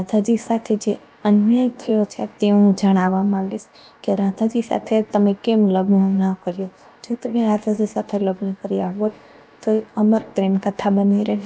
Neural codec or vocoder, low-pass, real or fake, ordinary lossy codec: codec, 16 kHz, about 1 kbps, DyCAST, with the encoder's durations; none; fake; none